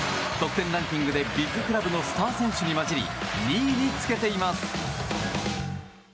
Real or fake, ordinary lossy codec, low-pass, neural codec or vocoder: real; none; none; none